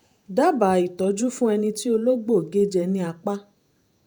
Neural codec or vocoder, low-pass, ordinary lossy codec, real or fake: vocoder, 48 kHz, 128 mel bands, Vocos; none; none; fake